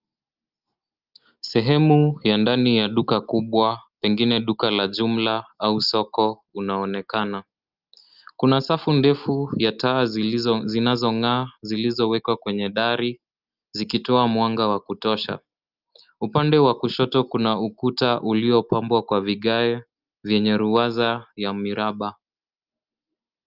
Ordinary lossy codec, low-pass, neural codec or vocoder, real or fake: Opus, 24 kbps; 5.4 kHz; none; real